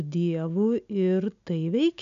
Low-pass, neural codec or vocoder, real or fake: 7.2 kHz; none; real